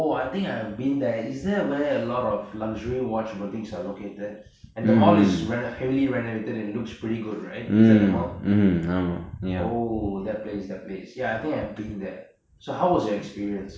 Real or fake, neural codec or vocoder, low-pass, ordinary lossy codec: real; none; none; none